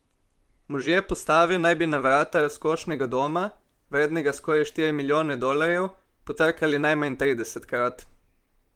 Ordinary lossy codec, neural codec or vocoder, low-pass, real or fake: Opus, 24 kbps; vocoder, 44.1 kHz, 128 mel bands, Pupu-Vocoder; 19.8 kHz; fake